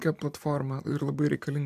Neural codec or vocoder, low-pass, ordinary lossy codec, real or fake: vocoder, 44.1 kHz, 128 mel bands every 256 samples, BigVGAN v2; 14.4 kHz; MP3, 96 kbps; fake